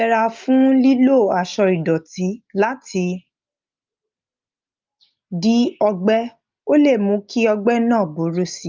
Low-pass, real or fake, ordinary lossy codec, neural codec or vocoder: 7.2 kHz; real; Opus, 24 kbps; none